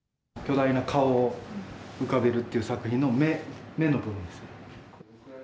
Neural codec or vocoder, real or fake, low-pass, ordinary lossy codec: none; real; none; none